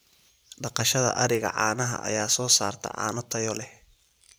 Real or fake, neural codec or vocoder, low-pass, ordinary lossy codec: fake; vocoder, 44.1 kHz, 128 mel bands every 512 samples, BigVGAN v2; none; none